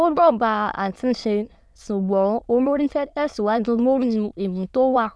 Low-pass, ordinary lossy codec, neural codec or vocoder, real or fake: none; none; autoencoder, 22.05 kHz, a latent of 192 numbers a frame, VITS, trained on many speakers; fake